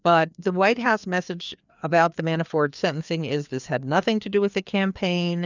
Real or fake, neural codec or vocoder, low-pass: fake; codec, 16 kHz, 2 kbps, FreqCodec, larger model; 7.2 kHz